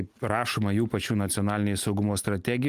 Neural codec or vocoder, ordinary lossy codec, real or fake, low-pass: none; Opus, 32 kbps; real; 14.4 kHz